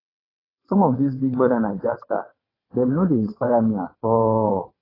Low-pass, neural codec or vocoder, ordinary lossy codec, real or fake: 5.4 kHz; vocoder, 22.05 kHz, 80 mel bands, WaveNeXt; AAC, 24 kbps; fake